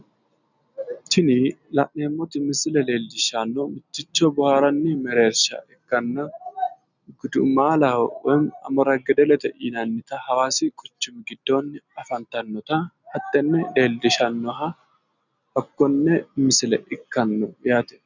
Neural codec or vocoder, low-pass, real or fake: none; 7.2 kHz; real